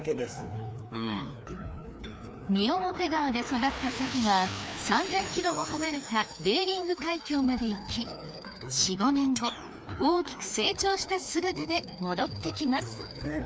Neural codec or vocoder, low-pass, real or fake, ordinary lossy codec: codec, 16 kHz, 2 kbps, FreqCodec, larger model; none; fake; none